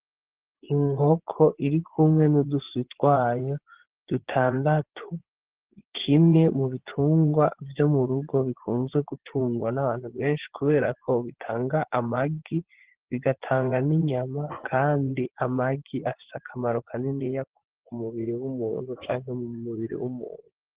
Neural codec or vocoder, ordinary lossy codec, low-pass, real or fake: vocoder, 24 kHz, 100 mel bands, Vocos; Opus, 16 kbps; 3.6 kHz; fake